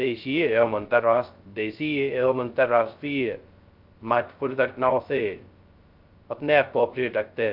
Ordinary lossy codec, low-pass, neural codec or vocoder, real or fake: Opus, 24 kbps; 5.4 kHz; codec, 16 kHz, 0.2 kbps, FocalCodec; fake